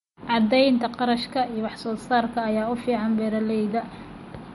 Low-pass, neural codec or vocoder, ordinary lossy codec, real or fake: 19.8 kHz; none; MP3, 48 kbps; real